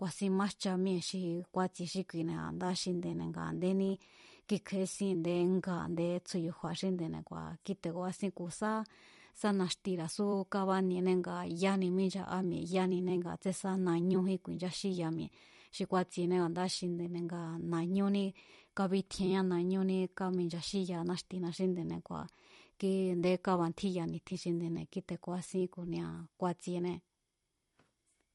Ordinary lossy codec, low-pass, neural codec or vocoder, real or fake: MP3, 48 kbps; 19.8 kHz; vocoder, 44.1 kHz, 128 mel bands every 256 samples, BigVGAN v2; fake